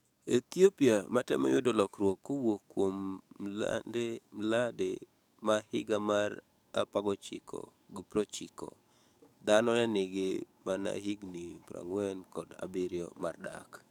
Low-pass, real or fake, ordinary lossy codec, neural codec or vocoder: 19.8 kHz; fake; none; codec, 44.1 kHz, 7.8 kbps, DAC